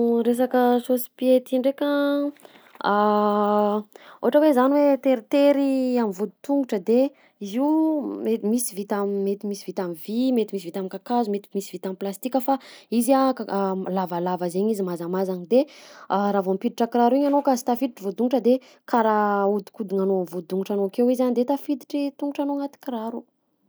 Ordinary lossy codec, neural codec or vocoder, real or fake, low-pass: none; none; real; none